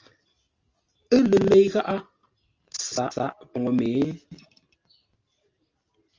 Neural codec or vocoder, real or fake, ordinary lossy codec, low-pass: none; real; Opus, 32 kbps; 7.2 kHz